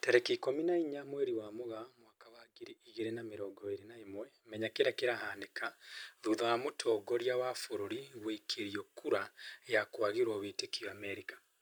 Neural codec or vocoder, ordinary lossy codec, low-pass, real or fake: none; none; none; real